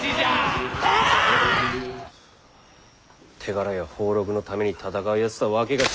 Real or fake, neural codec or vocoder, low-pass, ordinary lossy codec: real; none; none; none